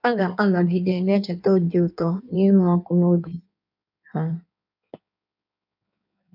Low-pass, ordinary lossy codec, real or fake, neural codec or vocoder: 5.4 kHz; none; fake; codec, 16 kHz in and 24 kHz out, 1.1 kbps, FireRedTTS-2 codec